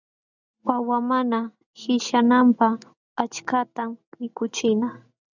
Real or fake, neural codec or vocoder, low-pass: real; none; 7.2 kHz